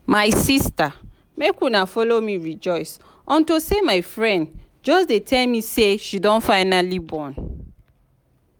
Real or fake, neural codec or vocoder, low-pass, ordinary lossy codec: real; none; none; none